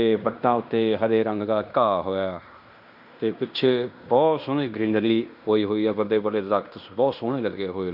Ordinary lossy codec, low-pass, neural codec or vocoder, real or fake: none; 5.4 kHz; codec, 16 kHz in and 24 kHz out, 0.9 kbps, LongCat-Audio-Codec, fine tuned four codebook decoder; fake